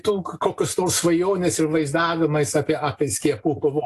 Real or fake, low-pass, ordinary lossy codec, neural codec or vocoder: real; 14.4 kHz; AAC, 48 kbps; none